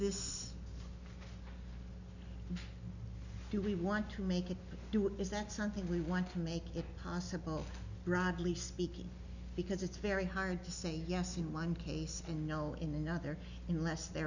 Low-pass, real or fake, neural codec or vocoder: 7.2 kHz; real; none